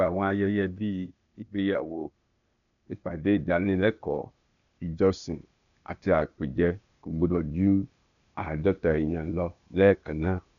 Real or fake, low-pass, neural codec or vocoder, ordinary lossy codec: fake; 7.2 kHz; codec, 16 kHz, 0.8 kbps, ZipCodec; none